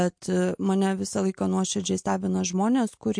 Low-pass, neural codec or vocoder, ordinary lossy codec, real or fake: 9.9 kHz; none; MP3, 48 kbps; real